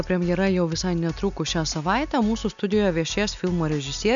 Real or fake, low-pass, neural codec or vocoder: real; 7.2 kHz; none